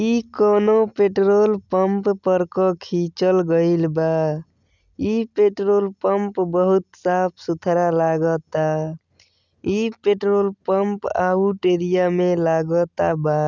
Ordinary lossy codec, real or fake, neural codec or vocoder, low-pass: none; real; none; 7.2 kHz